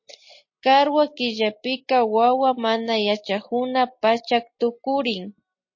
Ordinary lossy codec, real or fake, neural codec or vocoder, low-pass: MP3, 32 kbps; real; none; 7.2 kHz